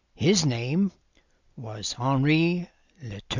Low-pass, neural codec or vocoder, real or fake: 7.2 kHz; none; real